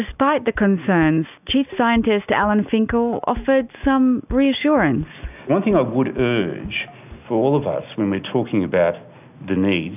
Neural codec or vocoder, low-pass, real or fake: none; 3.6 kHz; real